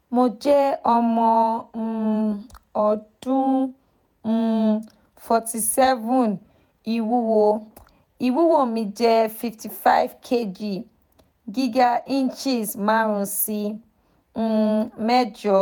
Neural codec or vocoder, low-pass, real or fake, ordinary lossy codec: vocoder, 48 kHz, 128 mel bands, Vocos; none; fake; none